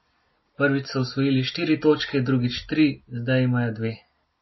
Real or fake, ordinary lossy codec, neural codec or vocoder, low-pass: real; MP3, 24 kbps; none; 7.2 kHz